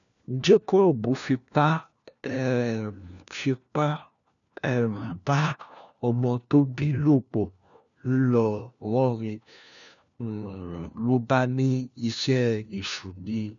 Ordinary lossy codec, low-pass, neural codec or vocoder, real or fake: none; 7.2 kHz; codec, 16 kHz, 1 kbps, FunCodec, trained on LibriTTS, 50 frames a second; fake